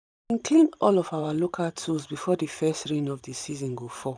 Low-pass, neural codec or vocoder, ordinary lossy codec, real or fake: 9.9 kHz; vocoder, 44.1 kHz, 128 mel bands every 512 samples, BigVGAN v2; none; fake